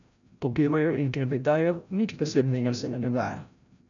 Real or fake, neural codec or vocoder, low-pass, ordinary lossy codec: fake; codec, 16 kHz, 0.5 kbps, FreqCodec, larger model; 7.2 kHz; none